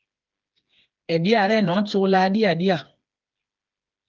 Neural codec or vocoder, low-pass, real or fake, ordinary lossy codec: codec, 16 kHz, 4 kbps, FreqCodec, smaller model; 7.2 kHz; fake; Opus, 32 kbps